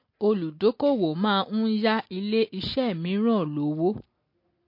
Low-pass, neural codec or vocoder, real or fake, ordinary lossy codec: 5.4 kHz; none; real; MP3, 32 kbps